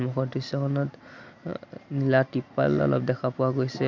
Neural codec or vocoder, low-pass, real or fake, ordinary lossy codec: none; 7.2 kHz; real; none